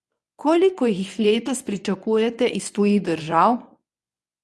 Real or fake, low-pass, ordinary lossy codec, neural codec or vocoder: fake; none; none; codec, 24 kHz, 0.9 kbps, WavTokenizer, medium speech release version 1